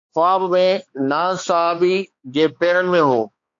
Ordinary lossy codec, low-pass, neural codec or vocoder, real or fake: AAC, 48 kbps; 7.2 kHz; codec, 16 kHz, 2 kbps, X-Codec, HuBERT features, trained on balanced general audio; fake